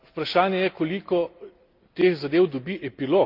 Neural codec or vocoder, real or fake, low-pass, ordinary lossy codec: none; real; 5.4 kHz; Opus, 24 kbps